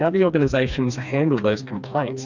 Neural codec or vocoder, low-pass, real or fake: codec, 16 kHz, 2 kbps, FreqCodec, smaller model; 7.2 kHz; fake